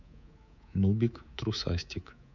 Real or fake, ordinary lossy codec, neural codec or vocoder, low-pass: fake; none; codec, 24 kHz, 3.1 kbps, DualCodec; 7.2 kHz